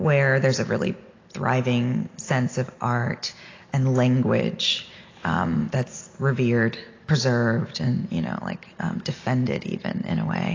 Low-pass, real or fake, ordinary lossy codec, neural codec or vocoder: 7.2 kHz; real; AAC, 32 kbps; none